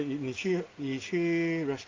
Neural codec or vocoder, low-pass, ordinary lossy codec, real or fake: none; 7.2 kHz; Opus, 32 kbps; real